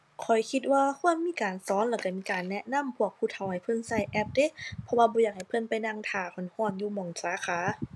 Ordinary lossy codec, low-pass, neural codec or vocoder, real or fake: none; none; none; real